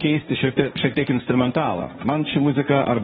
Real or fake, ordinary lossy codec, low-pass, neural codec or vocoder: fake; AAC, 16 kbps; 7.2 kHz; codec, 16 kHz, 1.1 kbps, Voila-Tokenizer